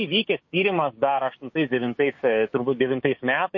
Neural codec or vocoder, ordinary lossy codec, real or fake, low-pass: codec, 16 kHz, 6 kbps, DAC; MP3, 32 kbps; fake; 7.2 kHz